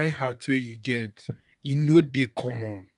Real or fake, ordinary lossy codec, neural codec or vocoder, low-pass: fake; none; codec, 24 kHz, 1 kbps, SNAC; 10.8 kHz